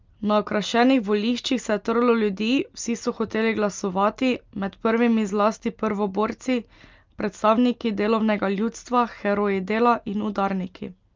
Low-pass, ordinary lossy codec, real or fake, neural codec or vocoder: 7.2 kHz; Opus, 24 kbps; real; none